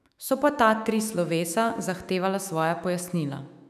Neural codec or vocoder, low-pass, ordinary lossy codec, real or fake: autoencoder, 48 kHz, 128 numbers a frame, DAC-VAE, trained on Japanese speech; 14.4 kHz; none; fake